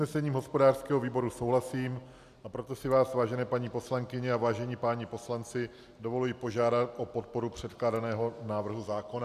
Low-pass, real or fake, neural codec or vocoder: 14.4 kHz; real; none